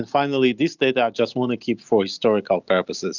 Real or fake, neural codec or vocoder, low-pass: real; none; 7.2 kHz